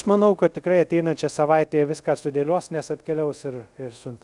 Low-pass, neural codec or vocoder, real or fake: 10.8 kHz; codec, 24 kHz, 0.5 kbps, DualCodec; fake